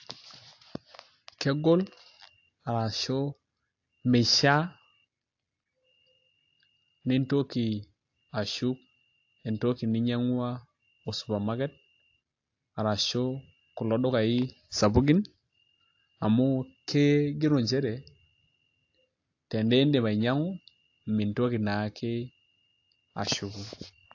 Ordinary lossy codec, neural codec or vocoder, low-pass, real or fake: AAC, 48 kbps; none; 7.2 kHz; real